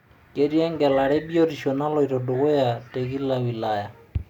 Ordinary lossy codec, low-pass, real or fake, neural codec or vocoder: none; 19.8 kHz; real; none